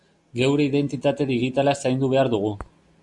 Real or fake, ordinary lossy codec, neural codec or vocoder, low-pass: real; MP3, 64 kbps; none; 10.8 kHz